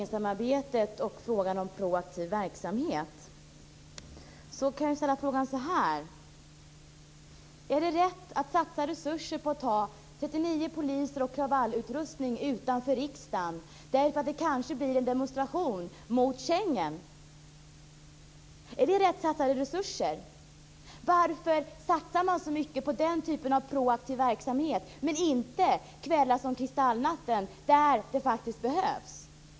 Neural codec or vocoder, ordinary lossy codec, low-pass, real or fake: none; none; none; real